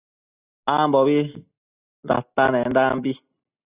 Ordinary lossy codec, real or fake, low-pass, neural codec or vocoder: Opus, 64 kbps; real; 3.6 kHz; none